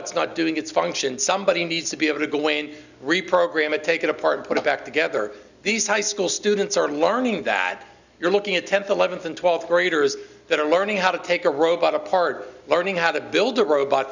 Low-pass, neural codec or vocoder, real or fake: 7.2 kHz; none; real